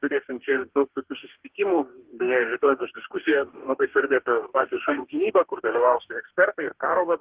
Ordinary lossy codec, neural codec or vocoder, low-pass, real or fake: Opus, 16 kbps; codec, 44.1 kHz, 2.6 kbps, DAC; 3.6 kHz; fake